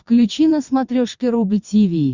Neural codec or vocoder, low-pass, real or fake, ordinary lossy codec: vocoder, 22.05 kHz, 80 mel bands, WaveNeXt; 7.2 kHz; fake; Opus, 64 kbps